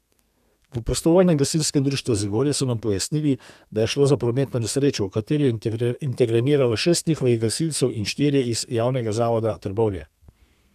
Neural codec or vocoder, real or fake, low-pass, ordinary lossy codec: codec, 32 kHz, 1.9 kbps, SNAC; fake; 14.4 kHz; none